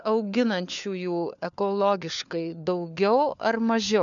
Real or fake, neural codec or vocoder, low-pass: fake; codec, 16 kHz, 4 kbps, FunCodec, trained on LibriTTS, 50 frames a second; 7.2 kHz